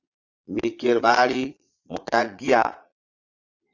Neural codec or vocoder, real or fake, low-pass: vocoder, 22.05 kHz, 80 mel bands, Vocos; fake; 7.2 kHz